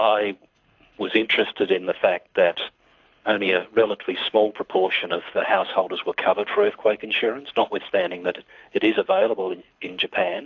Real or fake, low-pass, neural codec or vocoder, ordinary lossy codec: fake; 7.2 kHz; codec, 24 kHz, 6 kbps, HILCodec; AAC, 48 kbps